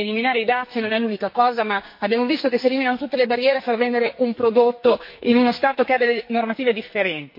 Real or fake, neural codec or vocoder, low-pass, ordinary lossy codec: fake; codec, 44.1 kHz, 2.6 kbps, SNAC; 5.4 kHz; MP3, 32 kbps